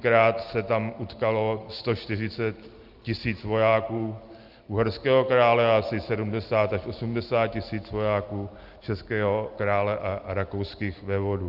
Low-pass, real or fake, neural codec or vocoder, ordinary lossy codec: 5.4 kHz; real; none; Opus, 24 kbps